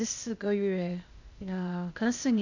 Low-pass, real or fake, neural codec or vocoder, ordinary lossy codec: 7.2 kHz; fake; codec, 16 kHz, 0.8 kbps, ZipCodec; none